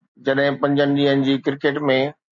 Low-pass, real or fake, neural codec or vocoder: 7.2 kHz; real; none